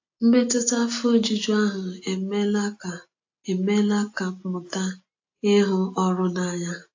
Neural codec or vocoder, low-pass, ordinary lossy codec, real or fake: none; 7.2 kHz; AAC, 48 kbps; real